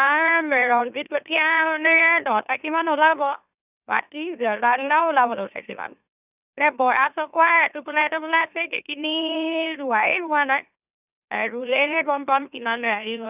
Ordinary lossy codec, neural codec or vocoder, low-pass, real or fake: none; autoencoder, 44.1 kHz, a latent of 192 numbers a frame, MeloTTS; 3.6 kHz; fake